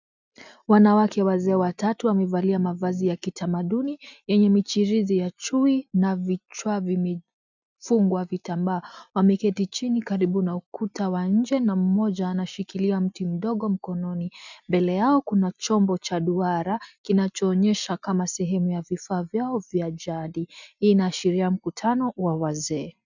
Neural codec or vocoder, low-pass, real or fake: none; 7.2 kHz; real